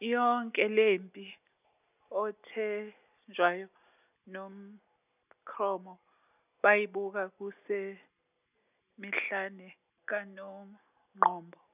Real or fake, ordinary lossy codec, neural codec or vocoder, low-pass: real; none; none; 3.6 kHz